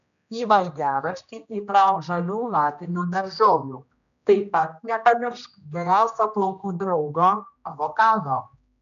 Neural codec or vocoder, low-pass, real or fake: codec, 16 kHz, 1 kbps, X-Codec, HuBERT features, trained on general audio; 7.2 kHz; fake